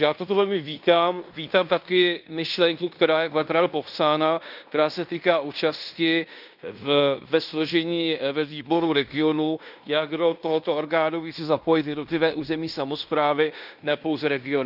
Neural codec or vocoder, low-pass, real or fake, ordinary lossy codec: codec, 16 kHz in and 24 kHz out, 0.9 kbps, LongCat-Audio-Codec, fine tuned four codebook decoder; 5.4 kHz; fake; none